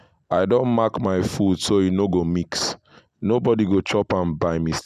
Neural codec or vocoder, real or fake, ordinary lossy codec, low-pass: none; real; none; 14.4 kHz